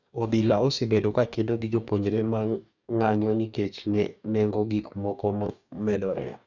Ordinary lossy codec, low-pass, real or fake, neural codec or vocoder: none; 7.2 kHz; fake; codec, 44.1 kHz, 2.6 kbps, DAC